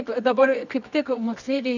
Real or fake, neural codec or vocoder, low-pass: fake; codec, 24 kHz, 0.9 kbps, WavTokenizer, medium music audio release; 7.2 kHz